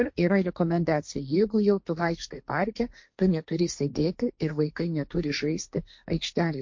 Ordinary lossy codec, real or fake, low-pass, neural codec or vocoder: MP3, 48 kbps; fake; 7.2 kHz; codec, 16 kHz, 1.1 kbps, Voila-Tokenizer